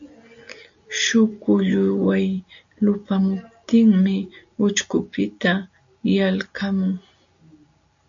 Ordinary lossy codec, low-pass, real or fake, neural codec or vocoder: AAC, 64 kbps; 7.2 kHz; real; none